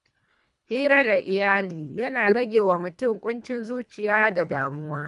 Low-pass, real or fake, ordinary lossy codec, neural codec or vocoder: 10.8 kHz; fake; none; codec, 24 kHz, 1.5 kbps, HILCodec